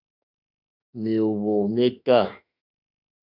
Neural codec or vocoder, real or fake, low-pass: autoencoder, 48 kHz, 32 numbers a frame, DAC-VAE, trained on Japanese speech; fake; 5.4 kHz